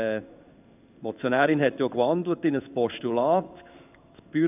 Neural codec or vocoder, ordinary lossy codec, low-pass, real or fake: codec, 16 kHz in and 24 kHz out, 1 kbps, XY-Tokenizer; none; 3.6 kHz; fake